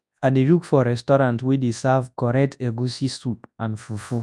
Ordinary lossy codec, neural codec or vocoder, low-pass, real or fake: none; codec, 24 kHz, 0.9 kbps, WavTokenizer, large speech release; none; fake